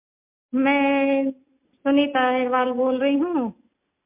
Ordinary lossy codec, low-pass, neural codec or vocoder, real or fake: MP3, 32 kbps; 3.6 kHz; none; real